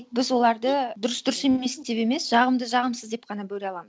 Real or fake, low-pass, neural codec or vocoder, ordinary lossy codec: real; none; none; none